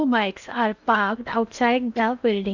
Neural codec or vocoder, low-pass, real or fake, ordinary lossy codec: codec, 16 kHz in and 24 kHz out, 0.8 kbps, FocalCodec, streaming, 65536 codes; 7.2 kHz; fake; none